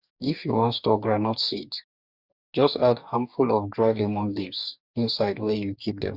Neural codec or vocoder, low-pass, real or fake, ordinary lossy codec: codec, 44.1 kHz, 2.6 kbps, DAC; 5.4 kHz; fake; Opus, 64 kbps